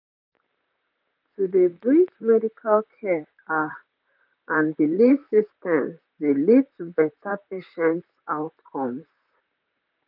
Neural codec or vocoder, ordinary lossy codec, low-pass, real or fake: vocoder, 44.1 kHz, 128 mel bands, Pupu-Vocoder; none; 5.4 kHz; fake